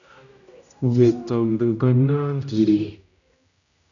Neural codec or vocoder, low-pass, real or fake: codec, 16 kHz, 0.5 kbps, X-Codec, HuBERT features, trained on general audio; 7.2 kHz; fake